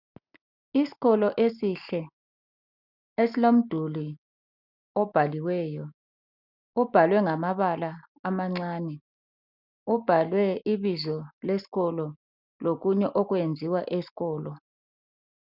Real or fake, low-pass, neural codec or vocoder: real; 5.4 kHz; none